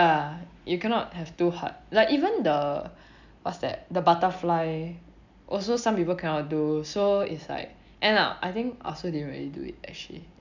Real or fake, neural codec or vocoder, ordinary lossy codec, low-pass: real; none; none; 7.2 kHz